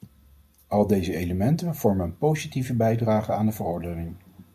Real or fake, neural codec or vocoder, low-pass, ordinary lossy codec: real; none; 14.4 kHz; MP3, 96 kbps